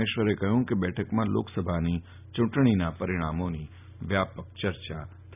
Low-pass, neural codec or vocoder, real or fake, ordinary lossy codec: 3.6 kHz; none; real; none